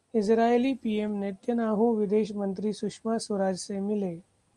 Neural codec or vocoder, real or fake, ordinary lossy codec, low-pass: none; real; Opus, 32 kbps; 10.8 kHz